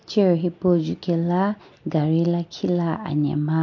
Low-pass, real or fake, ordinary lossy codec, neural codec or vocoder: 7.2 kHz; real; MP3, 48 kbps; none